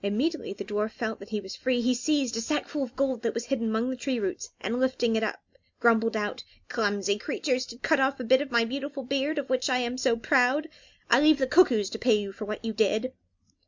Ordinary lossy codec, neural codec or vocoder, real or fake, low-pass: MP3, 64 kbps; none; real; 7.2 kHz